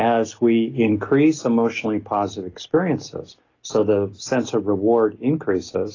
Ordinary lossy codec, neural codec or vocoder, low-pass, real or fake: AAC, 32 kbps; none; 7.2 kHz; real